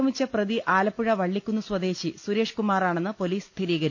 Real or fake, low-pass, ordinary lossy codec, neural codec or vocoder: real; none; none; none